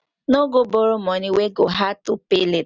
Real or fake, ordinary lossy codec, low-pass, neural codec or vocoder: real; none; 7.2 kHz; none